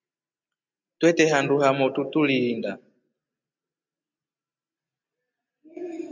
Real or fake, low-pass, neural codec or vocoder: real; 7.2 kHz; none